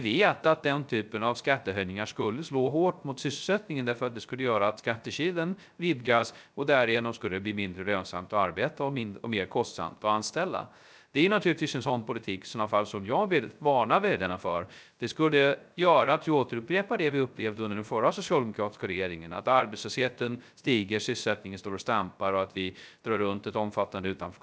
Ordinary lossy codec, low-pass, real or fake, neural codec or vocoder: none; none; fake; codec, 16 kHz, 0.3 kbps, FocalCodec